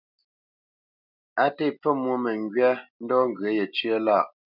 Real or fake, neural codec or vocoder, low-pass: real; none; 5.4 kHz